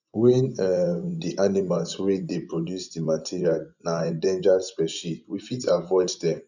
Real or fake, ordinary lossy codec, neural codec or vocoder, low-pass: fake; none; vocoder, 24 kHz, 100 mel bands, Vocos; 7.2 kHz